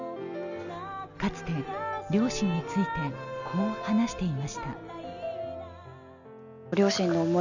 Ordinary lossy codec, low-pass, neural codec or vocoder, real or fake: none; 7.2 kHz; none; real